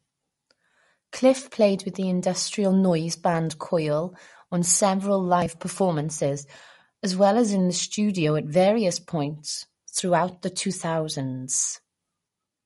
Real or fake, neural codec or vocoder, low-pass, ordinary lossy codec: real; none; 19.8 kHz; MP3, 48 kbps